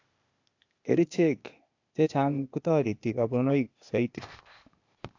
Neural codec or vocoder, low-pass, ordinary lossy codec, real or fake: codec, 16 kHz, 0.8 kbps, ZipCodec; 7.2 kHz; AAC, 48 kbps; fake